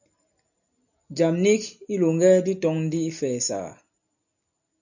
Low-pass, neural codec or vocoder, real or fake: 7.2 kHz; none; real